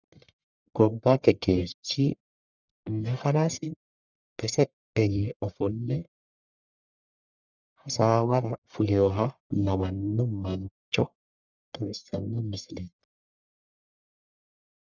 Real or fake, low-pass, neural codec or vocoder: fake; 7.2 kHz; codec, 44.1 kHz, 1.7 kbps, Pupu-Codec